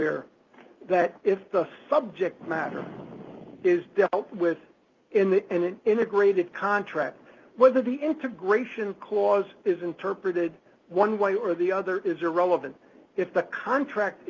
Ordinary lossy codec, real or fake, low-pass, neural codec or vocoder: Opus, 24 kbps; real; 7.2 kHz; none